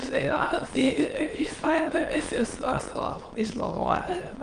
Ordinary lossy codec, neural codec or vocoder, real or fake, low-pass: Opus, 24 kbps; autoencoder, 22.05 kHz, a latent of 192 numbers a frame, VITS, trained on many speakers; fake; 9.9 kHz